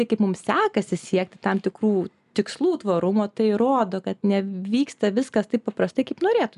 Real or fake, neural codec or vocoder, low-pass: real; none; 10.8 kHz